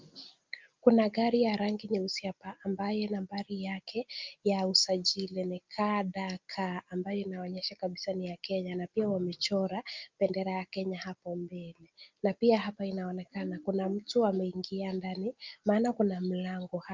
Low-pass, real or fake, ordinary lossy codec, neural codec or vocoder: 7.2 kHz; real; Opus, 24 kbps; none